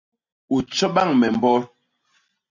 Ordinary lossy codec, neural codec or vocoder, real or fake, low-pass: MP3, 64 kbps; none; real; 7.2 kHz